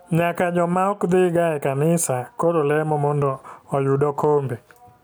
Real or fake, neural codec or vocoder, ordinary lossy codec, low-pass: real; none; none; none